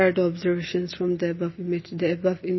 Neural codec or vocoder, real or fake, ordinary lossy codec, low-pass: none; real; MP3, 24 kbps; 7.2 kHz